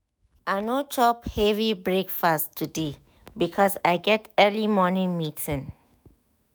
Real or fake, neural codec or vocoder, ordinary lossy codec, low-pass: fake; autoencoder, 48 kHz, 128 numbers a frame, DAC-VAE, trained on Japanese speech; none; none